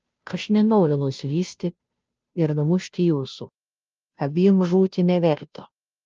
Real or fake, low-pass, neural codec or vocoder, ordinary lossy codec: fake; 7.2 kHz; codec, 16 kHz, 0.5 kbps, FunCodec, trained on Chinese and English, 25 frames a second; Opus, 24 kbps